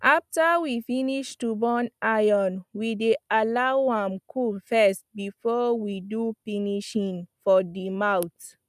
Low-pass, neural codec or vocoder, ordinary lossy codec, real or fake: 14.4 kHz; none; none; real